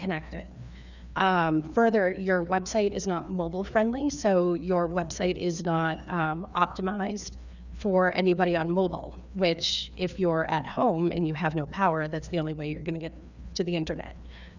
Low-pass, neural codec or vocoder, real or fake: 7.2 kHz; codec, 16 kHz, 2 kbps, FreqCodec, larger model; fake